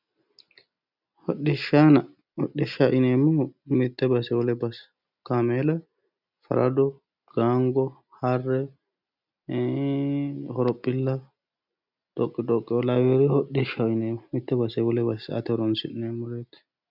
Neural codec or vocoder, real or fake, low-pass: none; real; 5.4 kHz